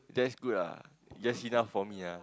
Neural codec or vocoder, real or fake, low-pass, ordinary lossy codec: none; real; none; none